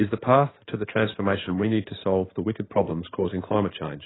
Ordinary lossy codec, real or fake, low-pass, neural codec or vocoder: AAC, 16 kbps; fake; 7.2 kHz; codec, 16 kHz in and 24 kHz out, 2.2 kbps, FireRedTTS-2 codec